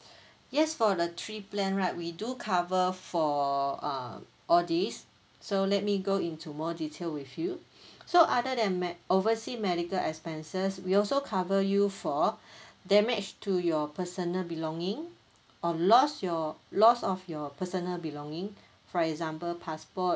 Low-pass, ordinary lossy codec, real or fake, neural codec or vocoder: none; none; real; none